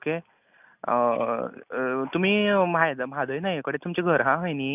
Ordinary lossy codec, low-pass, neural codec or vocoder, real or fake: none; 3.6 kHz; none; real